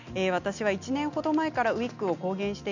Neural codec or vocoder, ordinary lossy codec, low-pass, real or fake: none; none; 7.2 kHz; real